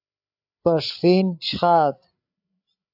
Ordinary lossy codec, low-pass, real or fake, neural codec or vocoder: AAC, 48 kbps; 5.4 kHz; fake; codec, 16 kHz, 8 kbps, FreqCodec, larger model